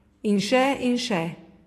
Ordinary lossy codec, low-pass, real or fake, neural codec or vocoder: AAC, 48 kbps; 14.4 kHz; fake; vocoder, 44.1 kHz, 128 mel bands every 512 samples, BigVGAN v2